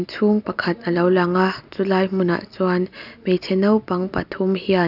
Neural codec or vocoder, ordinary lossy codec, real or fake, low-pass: none; none; real; 5.4 kHz